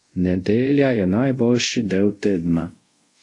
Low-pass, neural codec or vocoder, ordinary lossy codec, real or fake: 10.8 kHz; codec, 24 kHz, 0.5 kbps, DualCodec; AAC, 48 kbps; fake